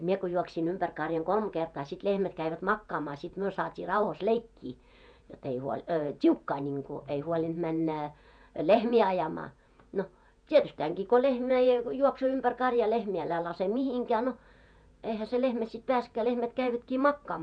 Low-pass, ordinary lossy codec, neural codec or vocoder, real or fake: 9.9 kHz; none; vocoder, 48 kHz, 128 mel bands, Vocos; fake